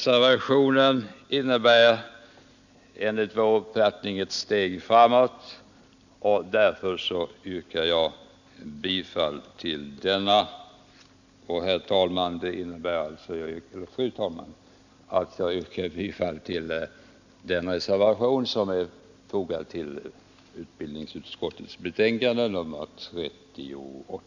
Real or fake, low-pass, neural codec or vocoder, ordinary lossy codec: real; 7.2 kHz; none; none